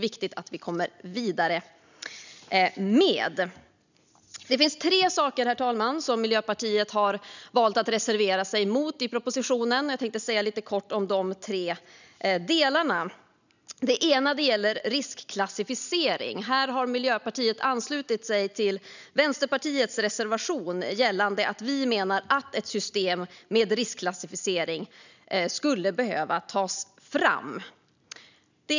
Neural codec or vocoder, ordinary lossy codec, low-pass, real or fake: none; none; 7.2 kHz; real